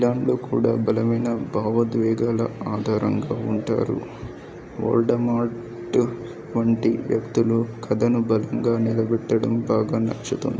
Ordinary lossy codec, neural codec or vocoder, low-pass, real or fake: none; none; none; real